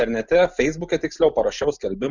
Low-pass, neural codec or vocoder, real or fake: 7.2 kHz; none; real